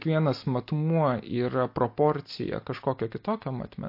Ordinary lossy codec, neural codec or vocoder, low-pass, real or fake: MP3, 32 kbps; none; 5.4 kHz; real